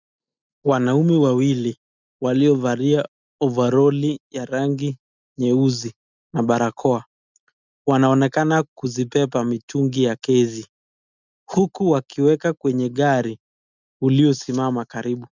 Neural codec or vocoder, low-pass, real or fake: none; 7.2 kHz; real